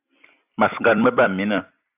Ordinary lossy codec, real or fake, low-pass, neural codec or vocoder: AAC, 32 kbps; fake; 3.6 kHz; vocoder, 44.1 kHz, 128 mel bands every 256 samples, BigVGAN v2